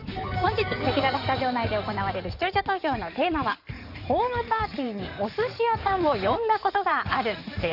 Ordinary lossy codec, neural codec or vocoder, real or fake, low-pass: none; codec, 16 kHz in and 24 kHz out, 2.2 kbps, FireRedTTS-2 codec; fake; 5.4 kHz